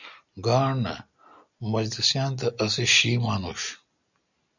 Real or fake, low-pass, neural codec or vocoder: real; 7.2 kHz; none